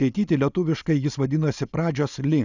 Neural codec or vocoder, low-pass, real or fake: none; 7.2 kHz; real